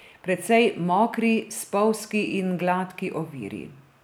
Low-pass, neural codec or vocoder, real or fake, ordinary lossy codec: none; none; real; none